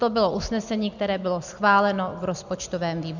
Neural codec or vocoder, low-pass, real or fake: none; 7.2 kHz; real